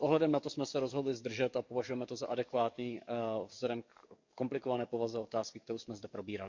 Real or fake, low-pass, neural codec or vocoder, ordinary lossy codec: fake; 7.2 kHz; codec, 44.1 kHz, 7.8 kbps, DAC; MP3, 64 kbps